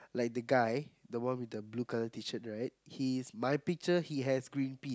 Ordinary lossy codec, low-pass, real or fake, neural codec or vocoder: none; none; real; none